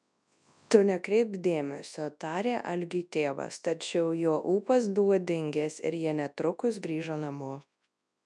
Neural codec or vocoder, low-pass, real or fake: codec, 24 kHz, 0.9 kbps, WavTokenizer, large speech release; 10.8 kHz; fake